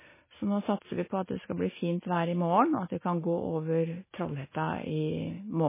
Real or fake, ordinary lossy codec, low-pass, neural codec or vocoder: real; MP3, 16 kbps; 3.6 kHz; none